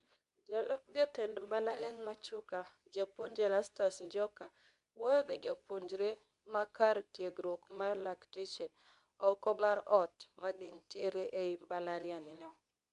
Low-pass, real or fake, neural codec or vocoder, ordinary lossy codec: 10.8 kHz; fake; codec, 24 kHz, 0.9 kbps, WavTokenizer, medium speech release version 2; none